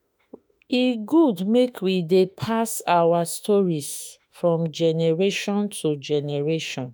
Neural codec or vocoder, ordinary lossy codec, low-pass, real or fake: autoencoder, 48 kHz, 32 numbers a frame, DAC-VAE, trained on Japanese speech; none; none; fake